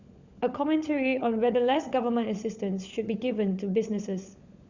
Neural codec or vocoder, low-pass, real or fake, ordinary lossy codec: codec, 16 kHz, 16 kbps, FunCodec, trained on LibriTTS, 50 frames a second; 7.2 kHz; fake; Opus, 64 kbps